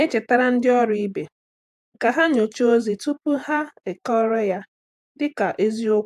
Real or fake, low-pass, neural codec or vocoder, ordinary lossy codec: fake; 14.4 kHz; vocoder, 48 kHz, 128 mel bands, Vocos; AAC, 96 kbps